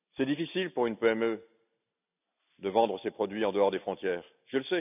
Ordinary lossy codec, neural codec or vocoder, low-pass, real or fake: none; none; 3.6 kHz; real